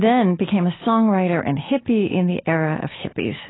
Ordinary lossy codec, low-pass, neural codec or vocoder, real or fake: AAC, 16 kbps; 7.2 kHz; none; real